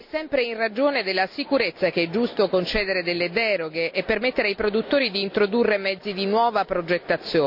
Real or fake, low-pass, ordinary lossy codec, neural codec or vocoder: real; 5.4 kHz; none; none